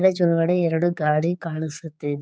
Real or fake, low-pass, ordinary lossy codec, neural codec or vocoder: fake; none; none; codec, 16 kHz, 4 kbps, X-Codec, HuBERT features, trained on general audio